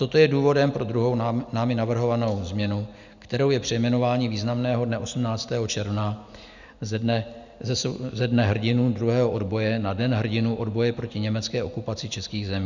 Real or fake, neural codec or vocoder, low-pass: real; none; 7.2 kHz